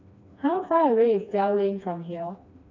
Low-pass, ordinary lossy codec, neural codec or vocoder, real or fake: 7.2 kHz; MP3, 48 kbps; codec, 16 kHz, 2 kbps, FreqCodec, smaller model; fake